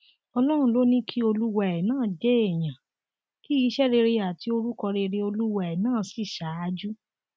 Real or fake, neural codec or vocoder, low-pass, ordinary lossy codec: real; none; none; none